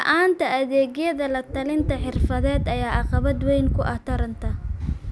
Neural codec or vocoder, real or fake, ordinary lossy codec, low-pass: none; real; none; none